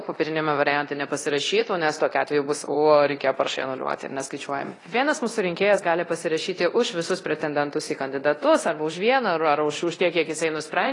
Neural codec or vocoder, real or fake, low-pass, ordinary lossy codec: codec, 24 kHz, 0.9 kbps, DualCodec; fake; 10.8 kHz; AAC, 32 kbps